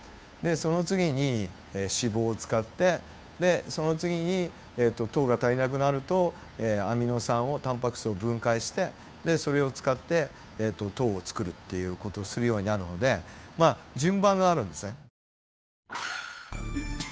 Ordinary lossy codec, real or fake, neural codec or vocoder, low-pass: none; fake; codec, 16 kHz, 2 kbps, FunCodec, trained on Chinese and English, 25 frames a second; none